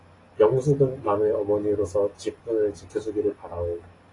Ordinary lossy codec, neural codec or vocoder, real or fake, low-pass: AAC, 32 kbps; none; real; 10.8 kHz